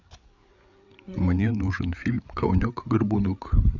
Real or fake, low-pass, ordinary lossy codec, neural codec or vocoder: fake; 7.2 kHz; none; codec, 16 kHz, 8 kbps, FreqCodec, larger model